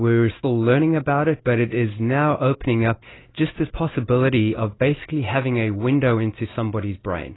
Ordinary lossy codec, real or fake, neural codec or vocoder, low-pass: AAC, 16 kbps; real; none; 7.2 kHz